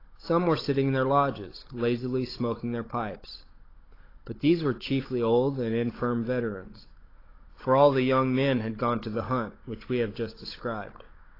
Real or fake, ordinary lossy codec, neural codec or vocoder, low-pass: fake; AAC, 24 kbps; codec, 16 kHz, 16 kbps, FunCodec, trained on Chinese and English, 50 frames a second; 5.4 kHz